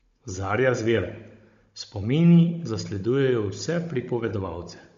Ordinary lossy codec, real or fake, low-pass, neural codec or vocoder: MP3, 48 kbps; fake; 7.2 kHz; codec, 16 kHz, 16 kbps, FunCodec, trained on Chinese and English, 50 frames a second